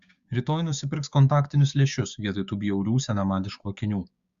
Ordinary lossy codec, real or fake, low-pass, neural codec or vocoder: Opus, 64 kbps; fake; 7.2 kHz; codec, 16 kHz, 6 kbps, DAC